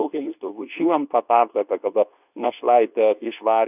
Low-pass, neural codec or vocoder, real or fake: 3.6 kHz; codec, 24 kHz, 0.9 kbps, WavTokenizer, medium speech release version 2; fake